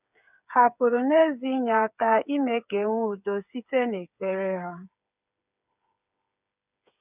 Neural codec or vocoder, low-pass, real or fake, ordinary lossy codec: codec, 16 kHz, 8 kbps, FreqCodec, smaller model; 3.6 kHz; fake; none